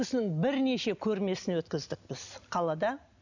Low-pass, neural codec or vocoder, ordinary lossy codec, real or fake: 7.2 kHz; none; none; real